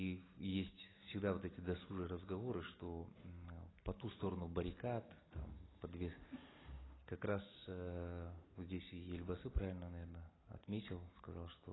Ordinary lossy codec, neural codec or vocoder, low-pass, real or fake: AAC, 16 kbps; none; 7.2 kHz; real